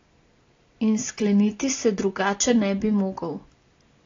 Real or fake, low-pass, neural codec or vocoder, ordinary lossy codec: real; 7.2 kHz; none; AAC, 32 kbps